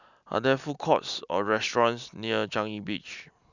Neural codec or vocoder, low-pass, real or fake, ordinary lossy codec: none; 7.2 kHz; real; none